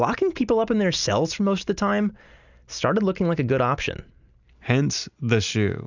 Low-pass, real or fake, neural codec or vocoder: 7.2 kHz; real; none